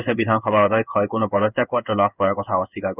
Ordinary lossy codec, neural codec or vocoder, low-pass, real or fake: none; codec, 16 kHz in and 24 kHz out, 1 kbps, XY-Tokenizer; 3.6 kHz; fake